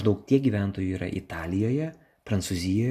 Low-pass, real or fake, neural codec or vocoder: 14.4 kHz; real; none